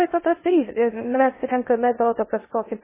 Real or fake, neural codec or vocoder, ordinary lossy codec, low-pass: fake; codec, 16 kHz in and 24 kHz out, 0.6 kbps, FocalCodec, streaming, 2048 codes; MP3, 16 kbps; 3.6 kHz